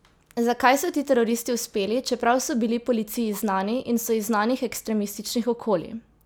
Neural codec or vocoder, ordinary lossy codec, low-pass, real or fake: none; none; none; real